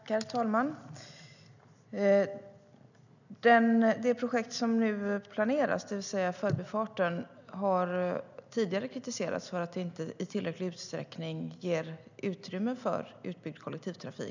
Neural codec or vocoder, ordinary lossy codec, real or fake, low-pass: none; none; real; 7.2 kHz